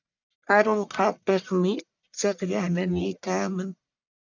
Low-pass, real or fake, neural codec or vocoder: 7.2 kHz; fake; codec, 44.1 kHz, 1.7 kbps, Pupu-Codec